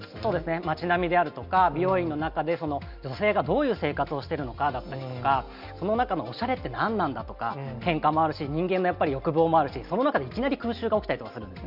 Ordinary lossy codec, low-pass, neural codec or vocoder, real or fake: none; 5.4 kHz; none; real